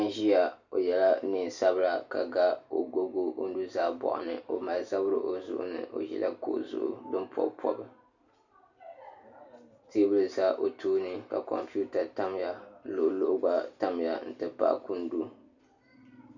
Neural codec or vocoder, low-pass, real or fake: none; 7.2 kHz; real